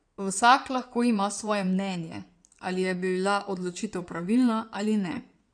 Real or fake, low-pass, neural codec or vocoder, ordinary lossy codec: fake; 9.9 kHz; codec, 16 kHz in and 24 kHz out, 2.2 kbps, FireRedTTS-2 codec; none